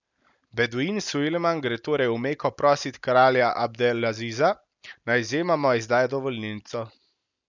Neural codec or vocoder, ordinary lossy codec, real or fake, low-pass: none; none; real; 7.2 kHz